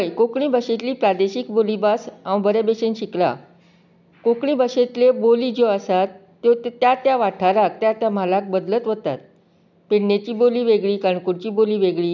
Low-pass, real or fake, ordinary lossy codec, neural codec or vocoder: 7.2 kHz; real; none; none